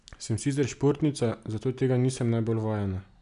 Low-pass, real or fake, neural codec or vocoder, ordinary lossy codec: 10.8 kHz; real; none; none